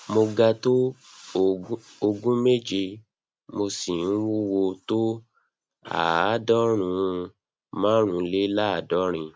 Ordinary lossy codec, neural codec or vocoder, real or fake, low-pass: none; none; real; none